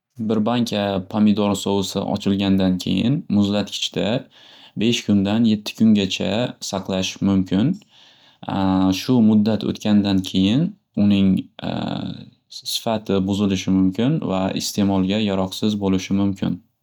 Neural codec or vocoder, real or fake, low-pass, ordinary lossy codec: none; real; 19.8 kHz; none